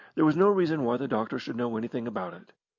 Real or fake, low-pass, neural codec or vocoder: real; 7.2 kHz; none